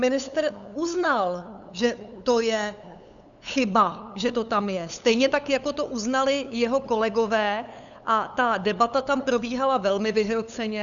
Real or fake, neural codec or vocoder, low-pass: fake; codec, 16 kHz, 8 kbps, FunCodec, trained on LibriTTS, 25 frames a second; 7.2 kHz